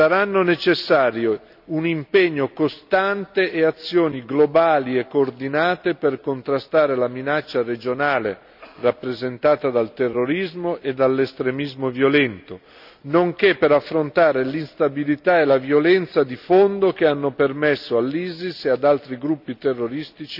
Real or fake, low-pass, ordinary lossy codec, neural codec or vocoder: real; 5.4 kHz; none; none